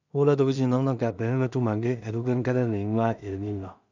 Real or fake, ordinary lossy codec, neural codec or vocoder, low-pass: fake; none; codec, 16 kHz in and 24 kHz out, 0.4 kbps, LongCat-Audio-Codec, two codebook decoder; 7.2 kHz